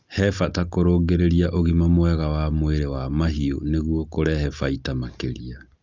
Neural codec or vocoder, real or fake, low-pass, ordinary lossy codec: none; real; 7.2 kHz; Opus, 24 kbps